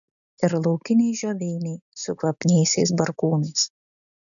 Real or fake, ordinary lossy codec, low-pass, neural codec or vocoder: real; MP3, 96 kbps; 7.2 kHz; none